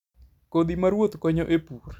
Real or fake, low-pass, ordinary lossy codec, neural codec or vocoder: real; 19.8 kHz; none; none